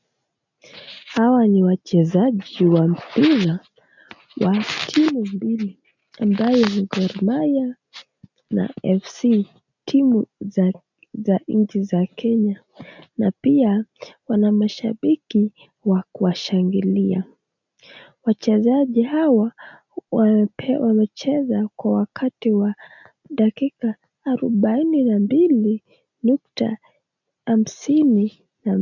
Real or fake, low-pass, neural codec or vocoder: real; 7.2 kHz; none